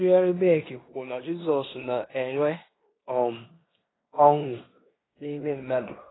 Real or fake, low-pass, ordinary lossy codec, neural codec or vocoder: fake; 7.2 kHz; AAC, 16 kbps; codec, 16 kHz in and 24 kHz out, 0.9 kbps, LongCat-Audio-Codec, fine tuned four codebook decoder